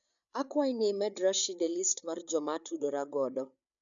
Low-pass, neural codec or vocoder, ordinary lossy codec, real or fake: 7.2 kHz; codec, 16 kHz, 8 kbps, FreqCodec, larger model; none; fake